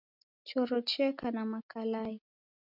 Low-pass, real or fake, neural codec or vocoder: 5.4 kHz; real; none